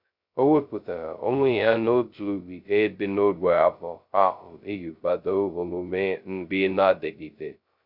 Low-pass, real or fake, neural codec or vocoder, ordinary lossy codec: 5.4 kHz; fake; codec, 16 kHz, 0.2 kbps, FocalCodec; none